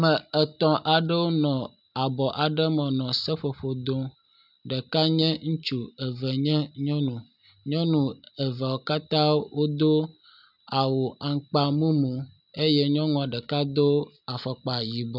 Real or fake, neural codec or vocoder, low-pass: real; none; 5.4 kHz